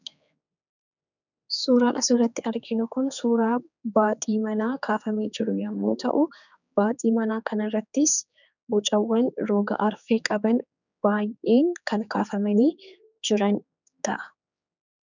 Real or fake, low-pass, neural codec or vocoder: fake; 7.2 kHz; codec, 16 kHz, 4 kbps, X-Codec, HuBERT features, trained on general audio